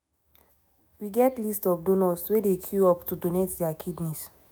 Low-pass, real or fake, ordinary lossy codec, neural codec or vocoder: none; fake; none; autoencoder, 48 kHz, 128 numbers a frame, DAC-VAE, trained on Japanese speech